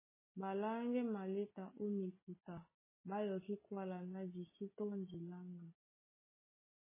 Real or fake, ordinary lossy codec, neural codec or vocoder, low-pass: real; MP3, 16 kbps; none; 3.6 kHz